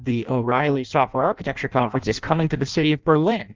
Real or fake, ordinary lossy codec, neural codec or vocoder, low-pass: fake; Opus, 24 kbps; codec, 16 kHz in and 24 kHz out, 0.6 kbps, FireRedTTS-2 codec; 7.2 kHz